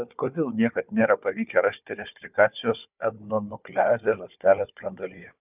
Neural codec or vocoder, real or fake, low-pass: codec, 16 kHz, 4 kbps, FunCodec, trained on Chinese and English, 50 frames a second; fake; 3.6 kHz